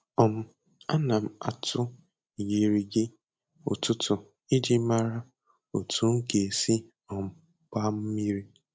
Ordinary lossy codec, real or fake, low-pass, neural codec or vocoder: none; real; none; none